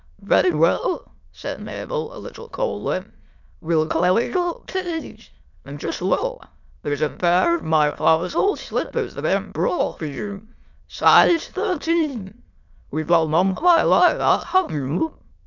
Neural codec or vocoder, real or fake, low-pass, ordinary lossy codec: autoencoder, 22.05 kHz, a latent of 192 numbers a frame, VITS, trained on many speakers; fake; 7.2 kHz; MP3, 64 kbps